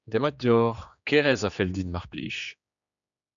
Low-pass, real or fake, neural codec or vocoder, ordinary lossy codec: 7.2 kHz; fake; codec, 16 kHz, 2 kbps, X-Codec, HuBERT features, trained on general audio; MP3, 96 kbps